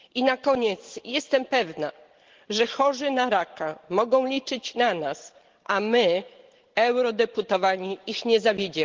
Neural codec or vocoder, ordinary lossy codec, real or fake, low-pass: none; Opus, 16 kbps; real; 7.2 kHz